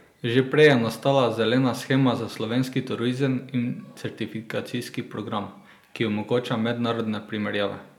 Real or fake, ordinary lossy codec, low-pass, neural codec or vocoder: real; none; 19.8 kHz; none